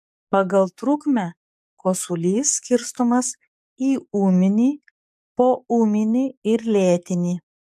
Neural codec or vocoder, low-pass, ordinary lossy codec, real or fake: codec, 44.1 kHz, 7.8 kbps, DAC; 14.4 kHz; AAC, 96 kbps; fake